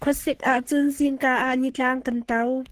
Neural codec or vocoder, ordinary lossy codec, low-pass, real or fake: codec, 44.1 kHz, 3.4 kbps, Pupu-Codec; Opus, 16 kbps; 14.4 kHz; fake